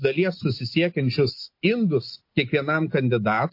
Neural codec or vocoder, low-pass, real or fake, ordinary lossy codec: none; 5.4 kHz; real; MP3, 32 kbps